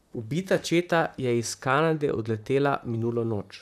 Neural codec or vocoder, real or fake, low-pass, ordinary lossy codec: vocoder, 44.1 kHz, 128 mel bands, Pupu-Vocoder; fake; 14.4 kHz; none